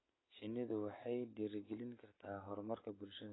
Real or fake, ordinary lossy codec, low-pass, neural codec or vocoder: real; AAC, 16 kbps; 7.2 kHz; none